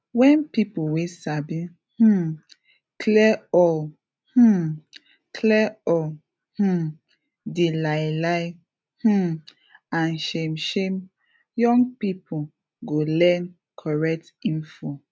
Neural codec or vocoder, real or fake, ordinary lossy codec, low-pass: none; real; none; none